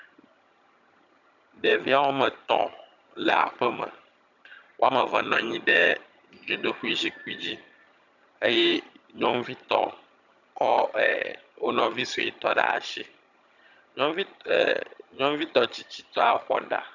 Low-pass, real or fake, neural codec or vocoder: 7.2 kHz; fake; vocoder, 22.05 kHz, 80 mel bands, HiFi-GAN